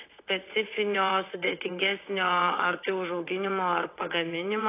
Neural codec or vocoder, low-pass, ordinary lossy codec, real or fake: vocoder, 22.05 kHz, 80 mel bands, WaveNeXt; 3.6 kHz; AAC, 24 kbps; fake